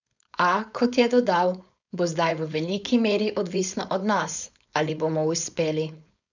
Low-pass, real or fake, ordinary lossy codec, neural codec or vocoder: 7.2 kHz; fake; none; codec, 16 kHz, 4.8 kbps, FACodec